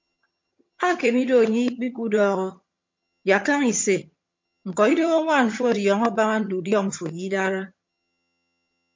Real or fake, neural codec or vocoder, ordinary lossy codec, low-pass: fake; vocoder, 22.05 kHz, 80 mel bands, HiFi-GAN; MP3, 48 kbps; 7.2 kHz